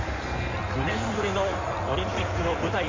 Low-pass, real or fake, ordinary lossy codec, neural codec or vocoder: 7.2 kHz; fake; MP3, 48 kbps; codec, 16 kHz in and 24 kHz out, 2.2 kbps, FireRedTTS-2 codec